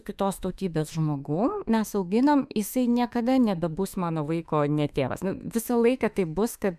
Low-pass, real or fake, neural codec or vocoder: 14.4 kHz; fake; autoencoder, 48 kHz, 32 numbers a frame, DAC-VAE, trained on Japanese speech